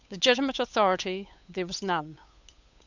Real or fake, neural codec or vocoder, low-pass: fake; codec, 16 kHz, 8 kbps, FunCodec, trained on Chinese and English, 25 frames a second; 7.2 kHz